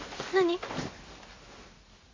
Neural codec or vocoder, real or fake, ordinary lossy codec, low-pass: none; real; MP3, 48 kbps; 7.2 kHz